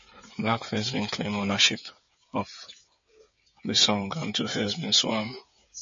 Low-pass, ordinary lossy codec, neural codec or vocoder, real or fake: 7.2 kHz; MP3, 32 kbps; codec, 16 kHz, 8 kbps, FreqCodec, smaller model; fake